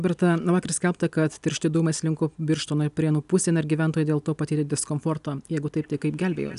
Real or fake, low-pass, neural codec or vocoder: real; 10.8 kHz; none